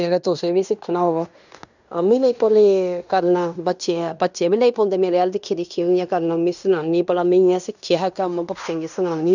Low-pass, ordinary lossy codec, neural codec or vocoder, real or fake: 7.2 kHz; none; codec, 16 kHz in and 24 kHz out, 0.9 kbps, LongCat-Audio-Codec, fine tuned four codebook decoder; fake